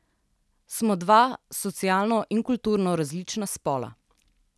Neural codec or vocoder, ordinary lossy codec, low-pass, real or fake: none; none; none; real